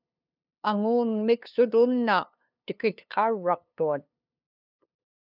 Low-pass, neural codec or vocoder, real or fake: 5.4 kHz; codec, 16 kHz, 2 kbps, FunCodec, trained on LibriTTS, 25 frames a second; fake